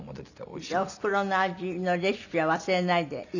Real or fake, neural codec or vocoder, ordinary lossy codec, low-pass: real; none; none; 7.2 kHz